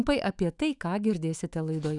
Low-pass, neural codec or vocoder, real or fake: 10.8 kHz; autoencoder, 48 kHz, 128 numbers a frame, DAC-VAE, trained on Japanese speech; fake